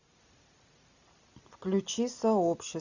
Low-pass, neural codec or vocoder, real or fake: 7.2 kHz; none; real